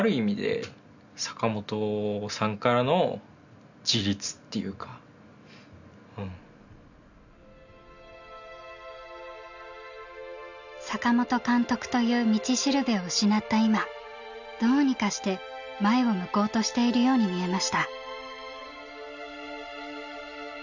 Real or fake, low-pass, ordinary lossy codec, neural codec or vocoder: real; 7.2 kHz; none; none